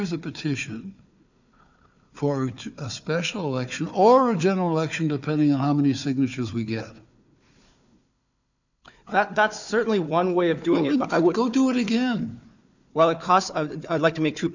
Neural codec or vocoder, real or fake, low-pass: codec, 16 kHz, 4 kbps, FunCodec, trained on Chinese and English, 50 frames a second; fake; 7.2 kHz